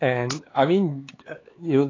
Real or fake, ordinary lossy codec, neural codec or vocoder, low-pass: fake; AAC, 32 kbps; codec, 16 kHz, 4 kbps, X-Codec, HuBERT features, trained on LibriSpeech; 7.2 kHz